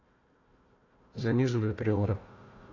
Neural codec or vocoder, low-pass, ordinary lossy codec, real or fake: codec, 16 kHz, 1 kbps, FunCodec, trained on Chinese and English, 50 frames a second; 7.2 kHz; AAC, 32 kbps; fake